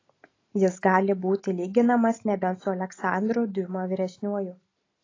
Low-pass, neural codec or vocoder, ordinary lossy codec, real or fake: 7.2 kHz; none; AAC, 32 kbps; real